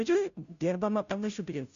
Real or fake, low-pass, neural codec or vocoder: fake; 7.2 kHz; codec, 16 kHz, 0.5 kbps, FunCodec, trained on Chinese and English, 25 frames a second